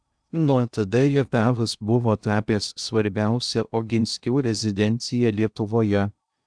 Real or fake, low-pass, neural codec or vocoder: fake; 9.9 kHz; codec, 16 kHz in and 24 kHz out, 0.6 kbps, FocalCodec, streaming, 2048 codes